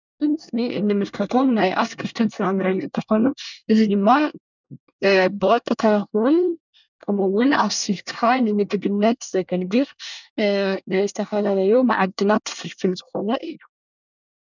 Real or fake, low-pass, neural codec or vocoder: fake; 7.2 kHz; codec, 24 kHz, 1 kbps, SNAC